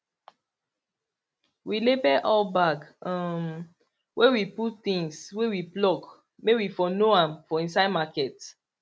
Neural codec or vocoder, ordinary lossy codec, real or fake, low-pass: none; none; real; none